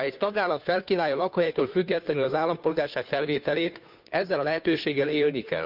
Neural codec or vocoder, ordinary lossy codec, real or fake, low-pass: codec, 16 kHz, 2 kbps, FunCodec, trained on Chinese and English, 25 frames a second; none; fake; 5.4 kHz